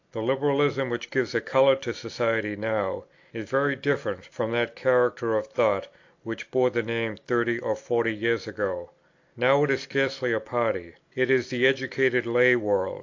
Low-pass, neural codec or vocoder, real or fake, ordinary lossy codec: 7.2 kHz; none; real; AAC, 48 kbps